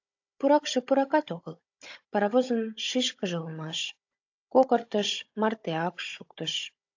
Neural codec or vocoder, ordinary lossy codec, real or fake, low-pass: codec, 16 kHz, 16 kbps, FunCodec, trained on Chinese and English, 50 frames a second; AAC, 48 kbps; fake; 7.2 kHz